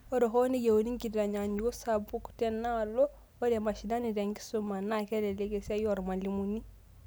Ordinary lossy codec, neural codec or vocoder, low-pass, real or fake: none; none; none; real